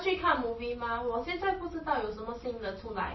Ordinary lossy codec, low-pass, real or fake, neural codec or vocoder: MP3, 24 kbps; 7.2 kHz; real; none